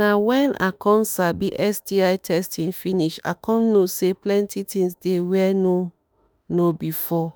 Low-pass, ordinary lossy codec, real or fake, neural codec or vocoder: none; none; fake; autoencoder, 48 kHz, 32 numbers a frame, DAC-VAE, trained on Japanese speech